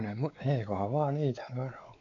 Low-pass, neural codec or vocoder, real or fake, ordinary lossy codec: 7.2 kHz; codec, 16 kHz, 4 kbps, X-Codec, WavLM features, trained on Multilingual LibriSpeech; fake; none